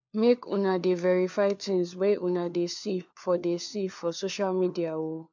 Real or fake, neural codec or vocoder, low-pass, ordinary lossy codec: fake; codec, 16 kHz, 4 kbps, FunCodec, trained on LibriTTS, 50 frames a second; 7.2 kHz; MP3, 64 kbps